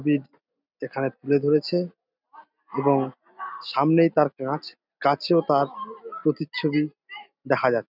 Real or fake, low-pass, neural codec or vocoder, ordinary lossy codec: real; 5.4 kHz; none; none